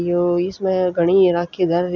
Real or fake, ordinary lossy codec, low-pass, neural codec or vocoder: real; none; 7.2 kHz; none